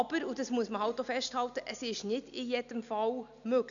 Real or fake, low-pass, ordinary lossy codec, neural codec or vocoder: real; 7.2 kHz; none; none